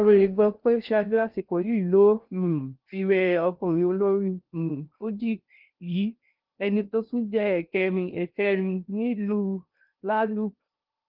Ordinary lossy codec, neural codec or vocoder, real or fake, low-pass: Opus, 24 kbps; codec, 16 kHz in and 24 kHz out, 0.6 kbps, FocalCodec, streaming, 2048 codes; fake; 5.4 kHz